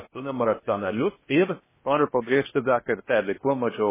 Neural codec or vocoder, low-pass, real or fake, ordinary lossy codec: codec, 16 kHz in and 24 kHz out, 0.6 kbps, FocalCodec, streaming, 2048 codes; 3.6 kHz; fake; MP3, 16 kbps